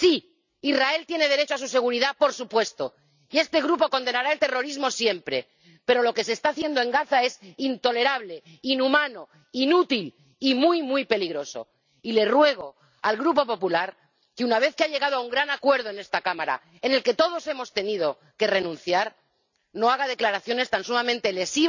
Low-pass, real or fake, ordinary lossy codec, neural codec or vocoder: 7.2 kHz; real; none; none